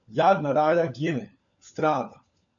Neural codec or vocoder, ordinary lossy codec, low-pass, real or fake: codec, 16 kHz, 4 kbps, FunCodec, trained on LibriTTS, 50 frames a second; MP3, 96 kbps; 7.2 kHz; fake